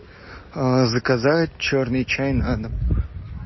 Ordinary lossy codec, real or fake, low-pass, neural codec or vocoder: MP3, 24 kbps; real; 7.2 kHz; none